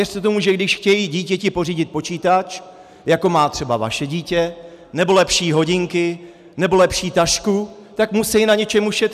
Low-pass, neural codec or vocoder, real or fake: 14.4 kHz; none; real